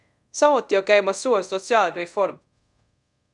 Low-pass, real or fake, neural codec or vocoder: 10.8 kHz; fake; codec, 24 kHz, 0.5 kbps, DualCodec